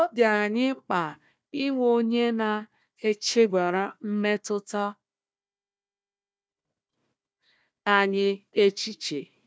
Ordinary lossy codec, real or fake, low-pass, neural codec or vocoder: none; fake; none; codec, 16 kHz, 1 kbps, FunCodec, trained on Chinese and English, 50 frames a second